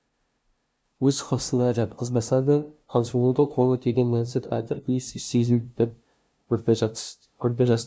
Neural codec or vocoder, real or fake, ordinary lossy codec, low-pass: codec, 16 kHz, 0.5 kbps, FunCodec, trained on LibriTTS, 25 frames a second; fake; none; none